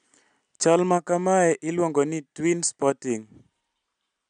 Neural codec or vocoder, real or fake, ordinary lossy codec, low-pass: none; real; MP3, 96 kbps; 9.9 kHz